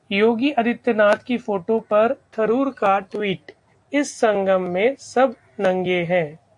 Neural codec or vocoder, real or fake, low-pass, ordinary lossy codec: none; real; 10.8 kHz; AAC, 64 kbps